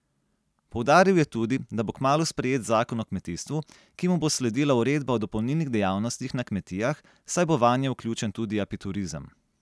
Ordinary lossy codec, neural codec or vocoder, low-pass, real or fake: none; none; none; real